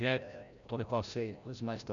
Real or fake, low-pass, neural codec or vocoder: fake; 7.2 kHz; codec, 16 kHz, 0.5 kbps, FreqCodec, larger model